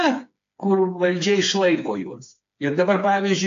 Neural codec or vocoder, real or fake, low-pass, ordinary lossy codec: codec, 16 kHz, 4 kbps, FreqCodec, smaller model; fake; 7.2 kHz; AAC, 48 kbps